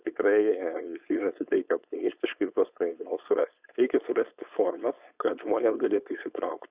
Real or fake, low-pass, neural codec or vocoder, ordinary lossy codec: fake; 3.6 kHz; codec, 16 kHz, 4.8 kbps, FACodec; Opus, 64 kbps